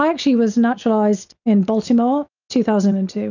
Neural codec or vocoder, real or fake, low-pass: none; real; 7.2 kHz